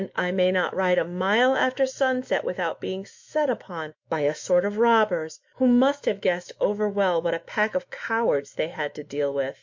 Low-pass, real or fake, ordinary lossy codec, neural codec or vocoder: 7.2 kHz; fake; MP3, 48 kbps; autoencoder, 48 kHz, 128 numbers a frame, DAC-VAE, trained on Japanese speech